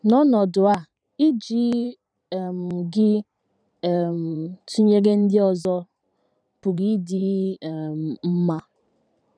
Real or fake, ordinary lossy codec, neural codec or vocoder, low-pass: fake; none; vocoder, 44.1 kHz, 128 mel bands every 512 samples, BigVGAN v2; 9.9 kHz